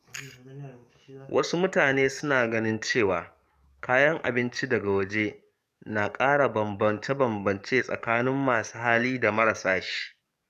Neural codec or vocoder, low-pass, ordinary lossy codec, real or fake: codec, 44.1 kHz, 7.8 kbps, DAC; 14.4 kHz; none; fake